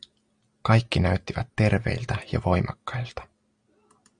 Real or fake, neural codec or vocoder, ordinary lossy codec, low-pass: real; none; Opus, 64 kbps; 9.9 kHz